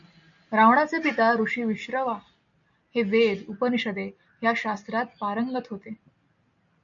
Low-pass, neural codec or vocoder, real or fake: 7.2 kHz; none; real